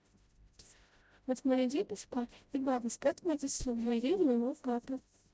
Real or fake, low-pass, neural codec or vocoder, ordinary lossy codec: fake; none; codec, 16 kHz, 0.5 kbps, FreqCodec, smaller model; none